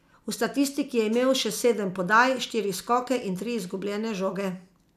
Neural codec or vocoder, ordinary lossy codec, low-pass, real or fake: none; none; 14.4 kHz; real